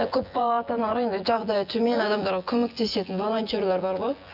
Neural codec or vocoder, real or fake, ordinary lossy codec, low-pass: vocoder, 24 kHz, 100 mel bands, Vocos; fake; none; 5.4 kHz